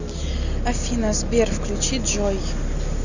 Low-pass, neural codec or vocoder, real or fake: 7.2 kHz; none; real